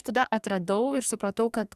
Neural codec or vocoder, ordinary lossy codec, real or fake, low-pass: codec, 44.1 kHz, 2.6 kbps, SNAC; Opus, 64 kbps; fake; 14.4 kHz